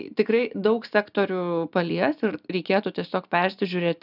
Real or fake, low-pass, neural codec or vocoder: real; 5.4 kHz; none